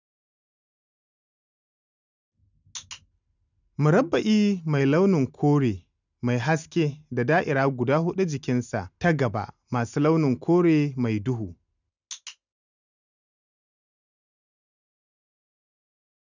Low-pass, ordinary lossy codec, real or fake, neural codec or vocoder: 7.2 kHz; none; real; none